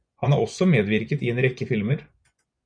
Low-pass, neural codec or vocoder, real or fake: 9.9 kHz; vocoder, 24 kHz, 100 mel bands, Vocos; fake